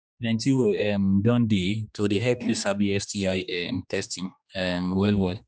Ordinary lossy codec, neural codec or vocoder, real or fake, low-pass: none; codec, 16 kHz, 2 kbps, X-Codec, HuBERT features, trained on general audio; fake; none